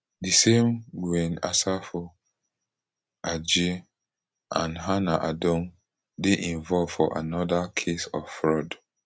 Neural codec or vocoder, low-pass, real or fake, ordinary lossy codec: none; none; real; none